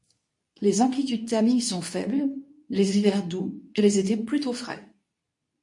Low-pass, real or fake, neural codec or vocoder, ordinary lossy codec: 10.8 kHz; fake; codec, 24 kHz, 0.9 kbps, WavTokenizer, medium speech release version 2; MP3, 48 kbps